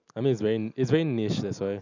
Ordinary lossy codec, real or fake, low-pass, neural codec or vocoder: none; real; 7.2 kHz; none